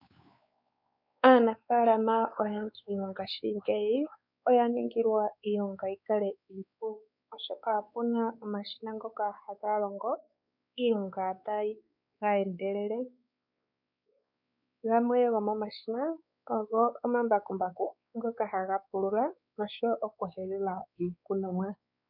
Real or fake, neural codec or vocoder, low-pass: fake; codec, 16 kHz, 4 kbps, X-Codec, WavLM features, trained on Multilingual LibriSpeech; 5.4 kHz